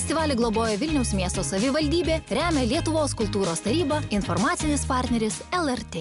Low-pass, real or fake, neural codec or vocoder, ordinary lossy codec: 10.8 kHz; real; none; MP3, 96 kbps